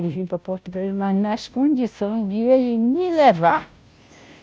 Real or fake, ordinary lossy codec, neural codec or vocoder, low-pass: fake; none; codec, 16 kHz, 0.5 kbps, FunCodec, trained on Chinese and English, 25 frames a second; none